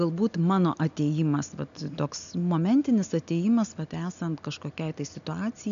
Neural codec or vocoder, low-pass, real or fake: none; 7.2 kHz; real